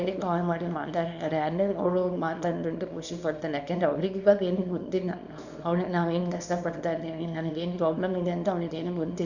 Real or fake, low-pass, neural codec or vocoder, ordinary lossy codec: fake; 7.2 kHz; codec, 24 kHz, 0.9 kbps, WavTokenizer, small release; none